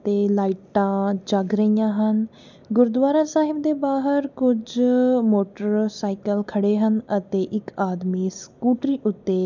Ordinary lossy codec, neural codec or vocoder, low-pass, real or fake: none; none; 7.2 kHz; real